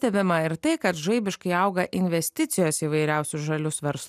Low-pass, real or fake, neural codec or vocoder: 14.4 kHz; real; none